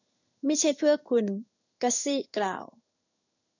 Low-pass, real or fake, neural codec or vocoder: 7.2 kHz; fake; codec, 16 kHz in and 24 kHz out, 1 kbps, XY-Tokenizer